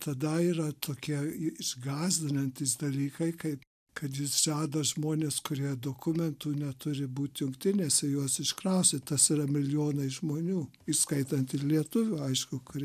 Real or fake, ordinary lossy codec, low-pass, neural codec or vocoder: real; MP3, 96 kbps; 14.4 kHz; none